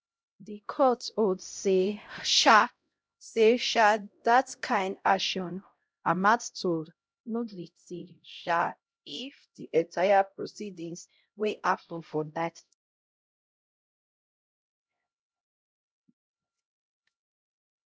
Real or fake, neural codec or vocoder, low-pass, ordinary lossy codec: fake; codec, 16 kHz, 0.5 kbps, X-Codec, HuBERT features, trained on LibriSpeech; none; none